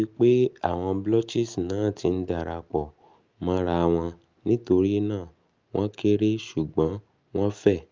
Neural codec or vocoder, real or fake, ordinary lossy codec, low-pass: none; real; Opus, 24 kbps; 7.2 kHz